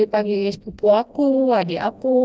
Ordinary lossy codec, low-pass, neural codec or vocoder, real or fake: none; none; codec, 16 kHz, 1 kbps, FreqCodec, smaller model; fake